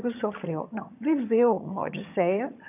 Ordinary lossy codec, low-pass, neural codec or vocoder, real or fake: AAC, 32 kbps; 3.6 kHz; vocoder, 22.05 kHz, 80 mel bands, HiFi-GAN; fake